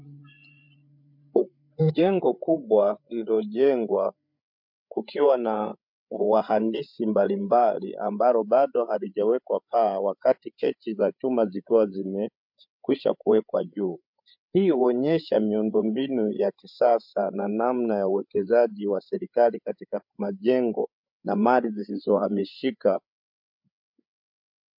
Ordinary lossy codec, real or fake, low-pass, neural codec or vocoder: MP3, 48 kbps; fake; 5.4 kHz; codec, 16 kHz, 8 kbps, FreqCodec, larger model